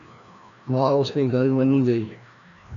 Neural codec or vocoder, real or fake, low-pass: codec, 16 kHz, 1 kbps, FreqCodec, larger model; fake; 7.2 kHz